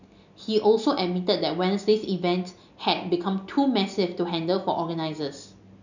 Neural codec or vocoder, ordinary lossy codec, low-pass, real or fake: none; none; 7.2 kHz; real